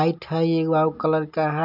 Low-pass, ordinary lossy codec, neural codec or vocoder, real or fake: 5.4 kHz; none; none; real